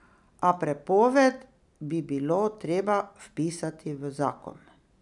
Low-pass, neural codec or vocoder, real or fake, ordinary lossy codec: 10.8 kHz; none; real; none